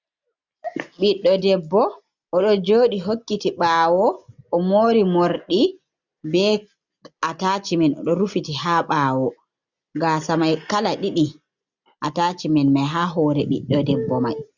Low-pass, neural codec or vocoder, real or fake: 7.2 kHz; none; real